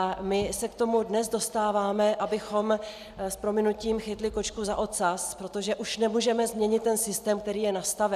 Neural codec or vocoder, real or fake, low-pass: none; real; 14.4 kHz